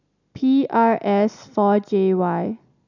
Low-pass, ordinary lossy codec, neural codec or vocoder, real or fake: 7.2 kHz; none; none; real